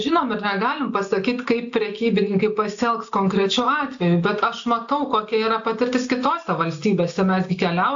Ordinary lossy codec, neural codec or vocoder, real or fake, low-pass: AAC, 64 kbps; none; real; 7.2 kHz